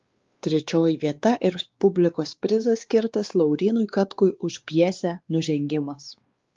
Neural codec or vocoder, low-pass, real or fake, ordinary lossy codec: codec, 16 kHz, 2 kbps, X-Codec, WavLM features, trained on Multilingual LibriSpeech; 7.2 kHz; fake; Opus, 32 kbps